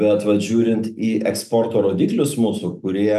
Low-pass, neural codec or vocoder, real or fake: 14.4 kHz; none; real